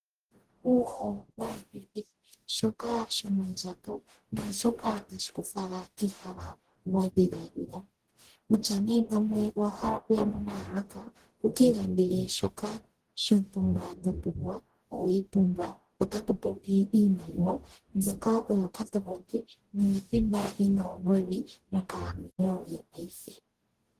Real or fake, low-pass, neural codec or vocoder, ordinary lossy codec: fake; 14.4 kHz; codec, 44.1 kHz, 0.9 kbps, DAC; Opus, 16 kbps